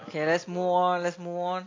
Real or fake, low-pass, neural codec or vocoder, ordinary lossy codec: real; 7.2 kHz; none; AAC, 32 kbps